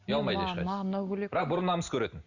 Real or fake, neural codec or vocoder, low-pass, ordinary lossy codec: real; none; 7.2 kHz; Opus, 64 kbps